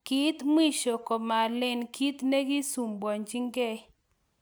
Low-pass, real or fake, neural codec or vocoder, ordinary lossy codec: none; real; none; none